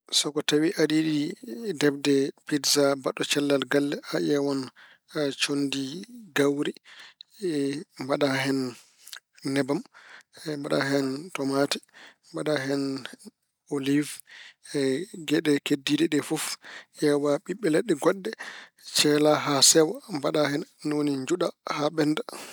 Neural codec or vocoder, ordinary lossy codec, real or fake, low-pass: none; none; real; none